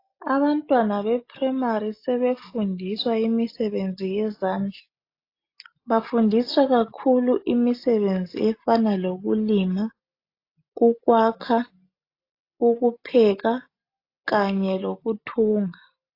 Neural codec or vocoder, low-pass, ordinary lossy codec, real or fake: none; 5.4 kHz; AAC, 32 kbps; real